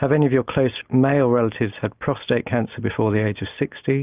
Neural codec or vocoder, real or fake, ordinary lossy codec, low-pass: none; real; Opus, 24 kbps; 3.6 kHz